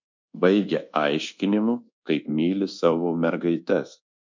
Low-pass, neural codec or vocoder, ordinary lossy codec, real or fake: 7.2 kHz; codec, 24 kHz, 1.2 kbps, DualCodec; MP3, 64 kbps; fake